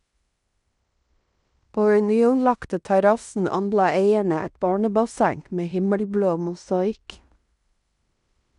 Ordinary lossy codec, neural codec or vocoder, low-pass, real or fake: none; codec, 16 kHz in and 24 kHz out, 0.9 kbps, LongCat-Audio-Codec, fine tuned four codebook decoder; 10.8 kHz; fake